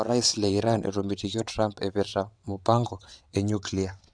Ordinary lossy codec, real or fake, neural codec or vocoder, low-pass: none; fake; vocoder, 22.05 kHz, 80 mel bands, WaveNeXt; 9.9 kHz